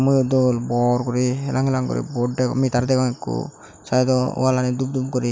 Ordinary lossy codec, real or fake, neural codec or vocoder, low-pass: none; real; none; none